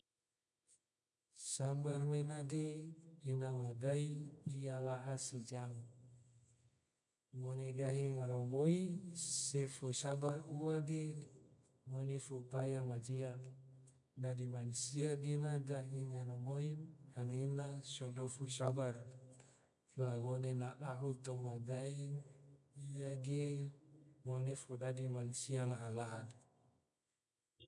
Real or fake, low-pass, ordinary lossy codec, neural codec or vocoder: fake; 10.8 kHz; none; codec, 24 kHz, 0.9 kbps, WavTokenizer, medium music audio release